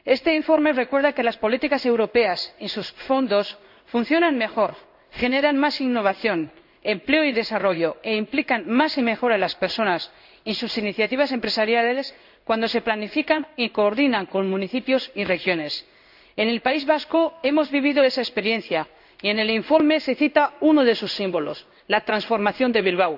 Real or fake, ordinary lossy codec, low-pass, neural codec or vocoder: fake; none; 5.4 kHz; codec, 16 kHz in and 24 kHz out, 1 kbps, XY-Tokenizer